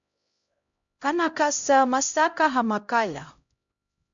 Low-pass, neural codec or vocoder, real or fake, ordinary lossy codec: 7.2 kHz; codec, 16 kHz, 0.5 kbps, X-Codec, HuBERT features, trained on LibriSpeech; fake; AAC, 64 kbps